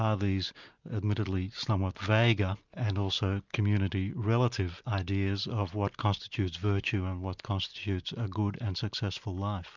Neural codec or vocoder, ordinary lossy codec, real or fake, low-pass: none; Opus, 64 kbps; real; 7.2 kHz